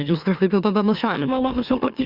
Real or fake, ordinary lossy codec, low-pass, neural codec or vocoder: fake; Opus, 64 kbps; 5.4 kHz; autoencoder, 44.1 kHz, a latent of 192 numbers a frame, MeloTTS